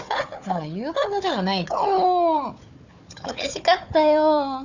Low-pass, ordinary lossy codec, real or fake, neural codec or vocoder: 7.2 kHz; none; fake; codec, 16 kHz, 4 kbps, FunCodec, trained on Chinese and English, 50 frames a second